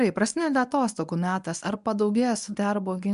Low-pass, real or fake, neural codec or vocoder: 10.8 kHz; fake; codec, 24 kHz, 0.9 kbps, WavTokenizer, medium speech release version 1